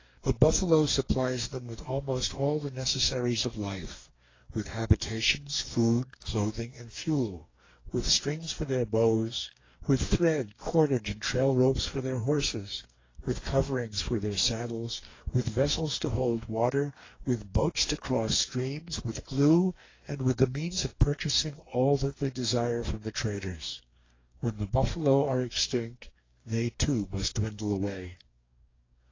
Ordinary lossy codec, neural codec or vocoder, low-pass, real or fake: AAC, 32 kbps; codec, 44.1 kHz, 2.6 kbps, DAC; 7.2 kHz; fake